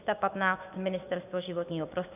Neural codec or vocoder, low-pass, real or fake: codec, 16 kHz, 6 kbps, DAC; 3.6 kHz; fake